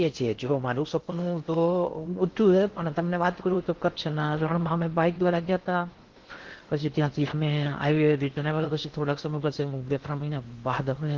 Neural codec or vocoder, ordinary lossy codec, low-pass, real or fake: codec, 16 kHz in and 24 kHz out, 0.6 kbps, FocalCodec, streaming, 2048 codes; Opus, 16 kbps; 7.2 kHz; fake